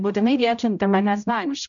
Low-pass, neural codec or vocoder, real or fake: 7.2 kHz; codec, 16 kHz, 0.5 kbps, X-Codec, HuBERT features, trained on general audio; fake